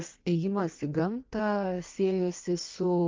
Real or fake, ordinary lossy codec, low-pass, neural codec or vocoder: fake; Opus, 32 kbps; 7.2 kHz; codec, 16 kHz in and 24 kHz out, 1.1 kbps, FireRedTTS-2 codec